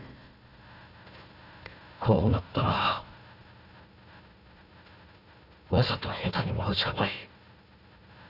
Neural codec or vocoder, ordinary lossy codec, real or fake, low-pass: codec, 16 kHz, 1 kbps, FunCodec, trained on Chinese and English, 50 frames a second; none; fake; 5.4 kHz